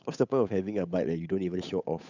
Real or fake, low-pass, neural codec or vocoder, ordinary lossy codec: fake; 7.2 kHz; codec, 16 kHz, 8 kbps, FunCodec, trained on Chinese and English, 25 frames a second; none